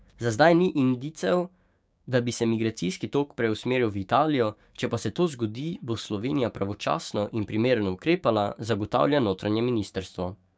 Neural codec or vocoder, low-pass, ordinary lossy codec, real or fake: codec, 16 kHz, 6 kbps, DAC; none; none; fake